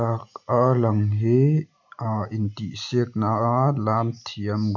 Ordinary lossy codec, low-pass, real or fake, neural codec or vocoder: none; 7.2 kHz; real; none